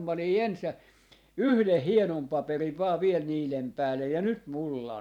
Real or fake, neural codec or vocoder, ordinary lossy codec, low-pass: fake; vocoder, 44.1 kHz, 128 mel bands every 512 samples, BigVGAN v2; none; 19.8 kHz